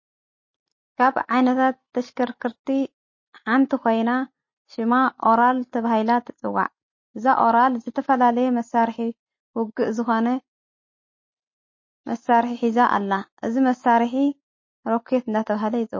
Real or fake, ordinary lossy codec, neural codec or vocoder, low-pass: real; MP3, 32 kbps; none; 7.2 kHz